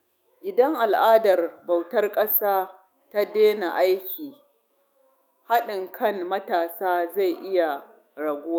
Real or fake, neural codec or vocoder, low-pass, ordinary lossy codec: fake; autoencoder, 48 kHz, 128 numbers a frame, DAC-VAE, trained on Japanese speech; none; none